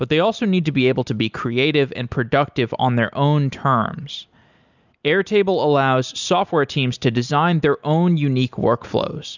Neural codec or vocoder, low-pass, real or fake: none; 7.2 kHz; real